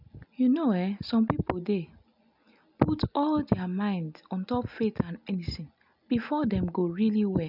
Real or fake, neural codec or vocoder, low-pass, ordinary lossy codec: real; none; 5.4 kHz; none